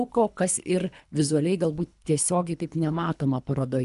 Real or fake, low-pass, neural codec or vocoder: fake; 10.8 kHz; codec, 24 kHz, 3 kbps, HILCodec